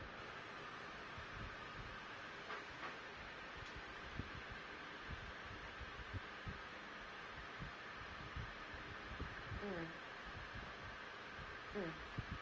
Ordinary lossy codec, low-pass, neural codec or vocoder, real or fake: Opus, 24 kbps; 7.2 kHz; none; real